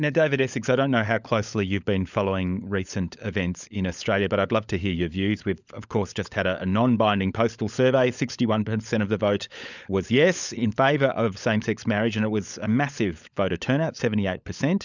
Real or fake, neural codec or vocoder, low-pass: fake; codec, 16 kHz, 16 kbps, FunCodec, trained on LibriTTS, 50 frames a second; 7.2 kHz